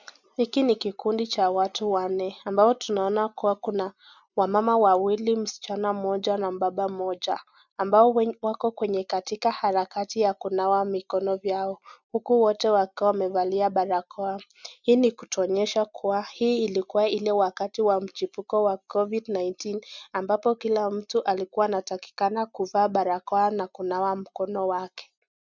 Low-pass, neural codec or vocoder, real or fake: 7.2 kHz; none; real